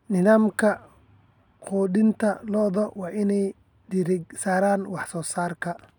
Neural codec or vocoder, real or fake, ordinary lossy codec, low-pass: none; real; none; 19.8 kHz